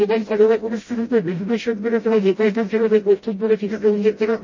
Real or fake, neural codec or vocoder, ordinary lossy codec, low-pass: fake; codec, 16 kHz, 0.5 kbps, FreqCodec, smaller model; MP3, 32 kbps; 7.2 kHz